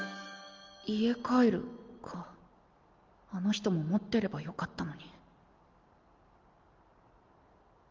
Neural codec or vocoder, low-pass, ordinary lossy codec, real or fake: none; 7.2 kHz; Opus, 32 kbps; real